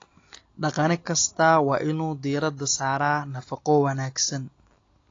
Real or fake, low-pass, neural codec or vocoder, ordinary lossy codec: real; 7.2 kHz; none; AAC, 32 kbps